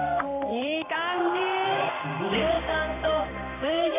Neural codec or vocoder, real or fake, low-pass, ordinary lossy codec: codec, 16 kHz in and 24 kHz out, 1 kbps, XY-Tokenizer; fake; 3.6 kHz; none